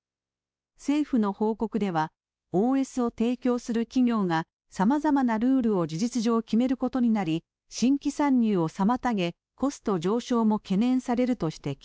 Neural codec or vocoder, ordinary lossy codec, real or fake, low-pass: codec, 16 kHz, 2 kbps, X-Codec, WavLM features, trained on Multilingual LibriSpeech; none; fake; none